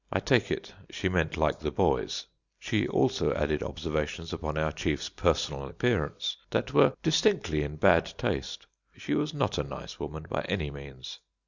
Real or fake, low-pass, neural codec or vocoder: real; 7.2 kHz; none